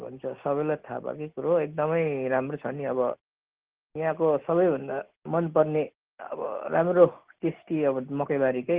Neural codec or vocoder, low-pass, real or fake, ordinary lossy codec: none; 3.6 kHz; real; Opus, 16 kbps